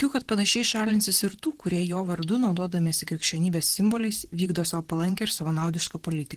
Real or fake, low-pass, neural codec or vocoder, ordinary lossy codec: fake; 14.4 kHz; vocoder, 44.1 kHz, 128 mel bands, Pupu-Vocoder; Opus, 16 kbps